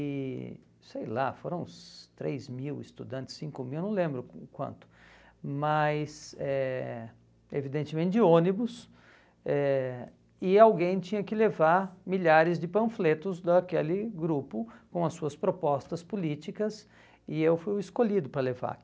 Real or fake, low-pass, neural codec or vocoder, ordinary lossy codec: real; none; none; none